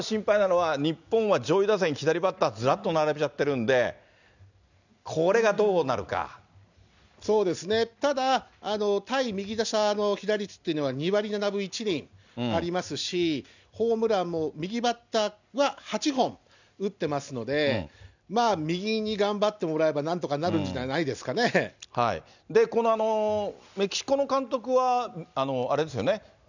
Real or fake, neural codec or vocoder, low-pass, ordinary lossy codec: real; none; 7.2 kHz; none